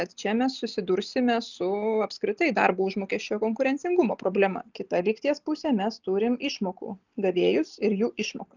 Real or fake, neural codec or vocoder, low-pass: real; none; 7.2 kHz